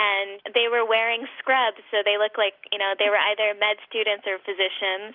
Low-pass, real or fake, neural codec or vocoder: 5.4 kHz; real; none